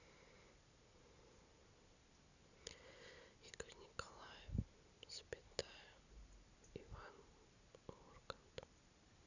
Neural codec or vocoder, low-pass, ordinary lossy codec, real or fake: none; 7.2 kHz; none; real